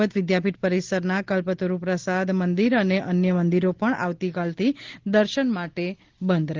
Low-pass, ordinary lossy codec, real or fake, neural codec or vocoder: 7.2 kHz; Opus, 16 kbps; real; none